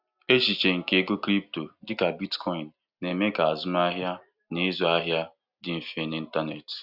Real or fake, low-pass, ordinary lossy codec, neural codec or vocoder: real; 5.4 kHz; Opus, 64 kbps; none